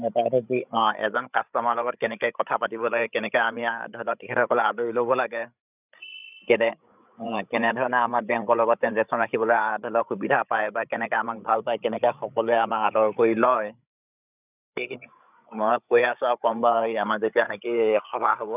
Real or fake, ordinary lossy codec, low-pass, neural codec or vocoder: fake; none; 3.6 kHz; codec, 16 kHz, 8 kbps, FreqCodec, larger model